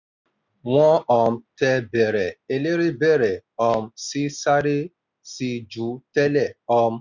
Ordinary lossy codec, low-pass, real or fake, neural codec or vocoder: none; 7.2 kHz; real; none